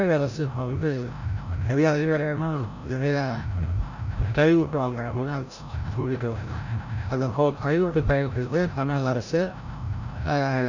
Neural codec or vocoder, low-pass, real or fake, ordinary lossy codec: codec, 16 kHz, 0.5 kbps, FreqCodec, larger model; 7.2 kHz; fake; none